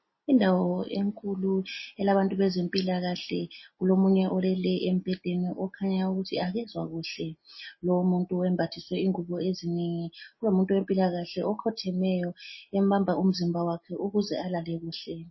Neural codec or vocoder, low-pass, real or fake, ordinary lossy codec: none; 7.2 kHz; real; MP3, 24 kbps